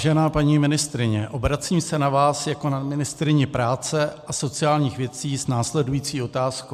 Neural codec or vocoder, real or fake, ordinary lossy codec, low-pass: none; real; MP3, 96 kbps; 14.4 kHz